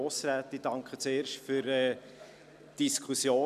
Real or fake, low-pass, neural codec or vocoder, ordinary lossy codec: real; 14.4 kHz; none; none